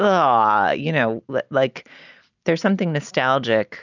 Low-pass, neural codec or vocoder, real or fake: 7.2 kHz; none; real